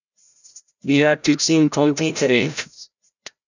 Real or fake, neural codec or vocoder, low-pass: fake; codec, 16 kHz, 0.5 kbps, FreqCodec, larger model; 7.2 kHz